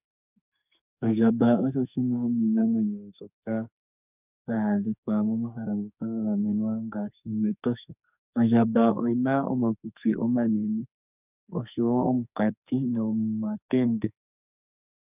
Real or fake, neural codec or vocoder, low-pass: fake; codec, 44.1 kHz, 2.6 kbps, SNAC; 3.6 kHz